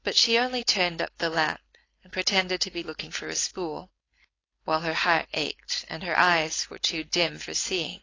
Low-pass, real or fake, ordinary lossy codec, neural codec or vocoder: 7.2 kHz; fake; AAC, 32 kbps; codec, 16 kHz, 4.8 kbps, FACodec